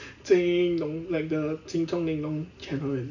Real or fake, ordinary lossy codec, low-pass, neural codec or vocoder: real; none; 7.2 kHz; none